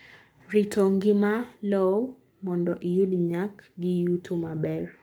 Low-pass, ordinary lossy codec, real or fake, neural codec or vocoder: none; none; fake; codec, 44.1 kHz, 7.8 kbps, Pupu-Codec